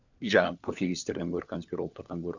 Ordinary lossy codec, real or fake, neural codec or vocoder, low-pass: none; fake; codec, 16 kHz, 4 kbps, FunCodec, trained on LibriTTS, 50 frames a second; 7.2 kHz